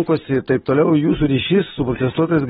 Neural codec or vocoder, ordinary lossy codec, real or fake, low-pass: none; AAC, 16 kbps; real; 19.8 kHz